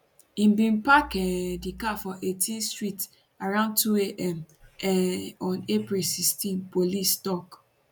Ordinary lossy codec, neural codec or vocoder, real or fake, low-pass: none; none; real; 19.8 kHz